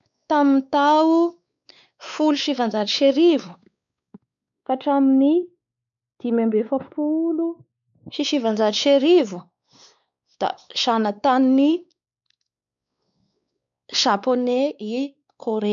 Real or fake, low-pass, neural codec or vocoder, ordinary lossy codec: fake; 7.2 kHz; codec, 16 kHz, 4 kbps, X-Codec, WavLM features, trained on Multilingual LibriSpeech; none